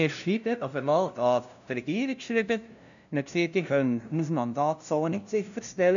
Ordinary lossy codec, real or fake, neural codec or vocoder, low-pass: none; fake; codec, 16 kHz, 0.5 kbps, FunCodec, trained on LibriTTS, 25 frames a second; 7.2 kHz